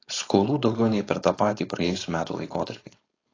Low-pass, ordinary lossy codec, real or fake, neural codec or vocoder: 7.2 kHz; AAC, 32 kbps; fake; codec, 16 kHz, 4.8 kbps, FACodec